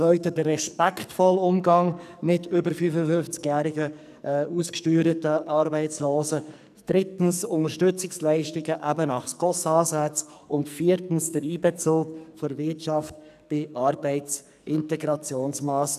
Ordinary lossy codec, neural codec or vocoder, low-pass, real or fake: none; codec, 44.1 kHz, 2.6 kbps, SNAC; 14.4 kHz; fake